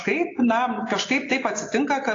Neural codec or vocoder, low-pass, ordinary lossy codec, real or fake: none; 7.2 kHz; AAC, 32 kbps; real